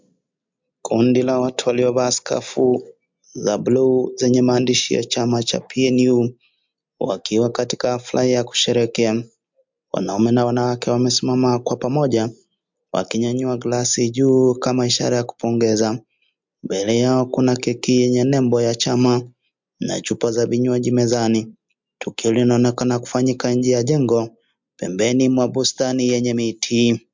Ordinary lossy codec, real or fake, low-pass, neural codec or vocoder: MP3, 64 kbps; real; 7.2 kHz; none